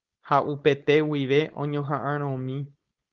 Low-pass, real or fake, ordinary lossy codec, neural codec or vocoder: 7.2 kHz; fake; Opus, 32 kbps; codec, 16 kHz, 4.8 kbps, FACodec